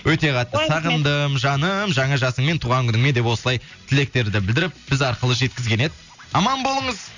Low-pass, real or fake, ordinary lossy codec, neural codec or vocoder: 7.2 kHz; real; none; none